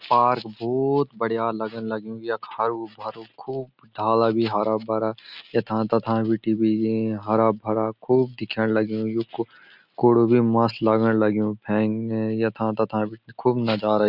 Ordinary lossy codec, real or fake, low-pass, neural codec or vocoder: none; real; 5.4 kHz; none